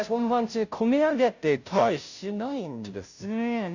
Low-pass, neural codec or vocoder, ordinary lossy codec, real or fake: 7.2 kHz; codec, 16 kHz, 0.5 kbps, FunCodec, trained on Chinese and English, 25 frames a second; none; fake